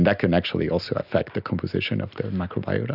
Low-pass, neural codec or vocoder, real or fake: 5.4 kHz; none; real